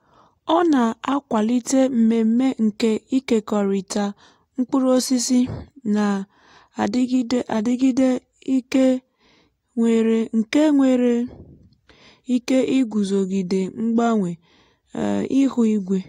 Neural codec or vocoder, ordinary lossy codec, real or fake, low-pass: none; AAC, 48 kbps; real; 19.8 kHz